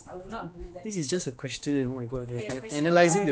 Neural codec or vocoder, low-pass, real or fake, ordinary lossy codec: codec, 16 kHz, 2 kbps, X-Codec, HuBERT features, trained on general audio; none; fake; none